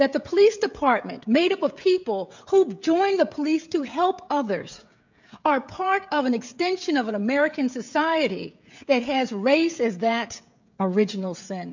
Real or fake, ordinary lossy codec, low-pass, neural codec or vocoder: fake; MP3, 64 kbps; 7.2 kHz; codec, 16 kHz, 16 kbps, FreqCodec, smaller model